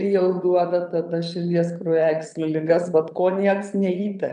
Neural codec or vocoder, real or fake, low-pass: vocoder, 22.05 kHz, 80 mel bands, Vocos; fake; 9.9 kHz